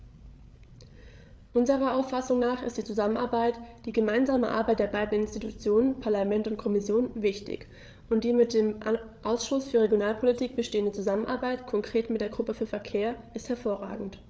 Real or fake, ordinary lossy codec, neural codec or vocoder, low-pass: fake; none; codec, 16 kHz, 8 kbps, FreqCodec, larger model; none